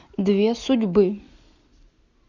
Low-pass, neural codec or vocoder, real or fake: 7.2 kHz; none; real